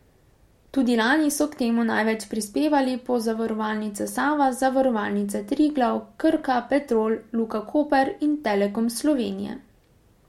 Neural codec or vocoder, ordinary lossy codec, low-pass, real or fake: none; MP3, 64 kbps; 19.8 kHz; real